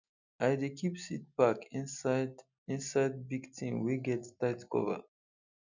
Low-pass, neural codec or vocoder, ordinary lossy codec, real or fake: 7.2 kHz; none; none; real